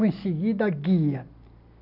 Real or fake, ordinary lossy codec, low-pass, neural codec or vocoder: real; none; 5.4 kHz; none